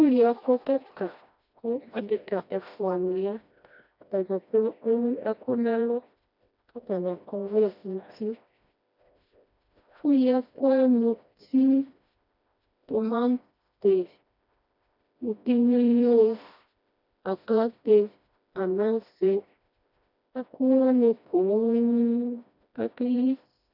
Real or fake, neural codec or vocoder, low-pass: fake; codec, 16 kHz, 1 kbps, FreqCodec, smaller model; 5.4 kHz